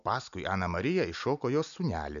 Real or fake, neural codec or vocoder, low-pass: real; none; 7.2 kHz